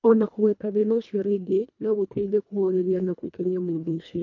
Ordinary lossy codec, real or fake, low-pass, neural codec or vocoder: MP3, 64 kbps; fake; 7.2 kHz; codec, 24 kHz, 1.5 kbps, HILCodec